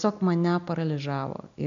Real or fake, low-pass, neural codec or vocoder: real; 7.2 kHz; none